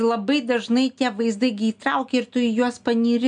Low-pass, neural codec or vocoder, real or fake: 9.9 kHz; none; real